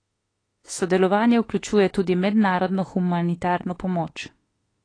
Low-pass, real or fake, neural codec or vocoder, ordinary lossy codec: 9.9 kHz; fake; autoencoder, 48 kHz, 32 numbers a frame, DAC-VAE, trained on Japanese speech; AAC, 32 kbps